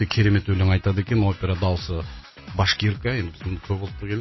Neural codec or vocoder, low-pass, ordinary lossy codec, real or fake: none; 7.2 kHz; MP3, 24 kbps; real